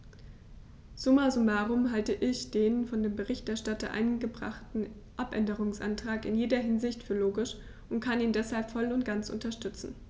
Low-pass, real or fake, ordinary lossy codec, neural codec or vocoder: none; real; none; none